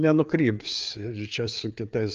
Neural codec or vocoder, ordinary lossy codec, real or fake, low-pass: codec, 16 kHz, 4 kbps, FreqCodec, larger model; Opus, 32 kbps; fake; 7.2 kHz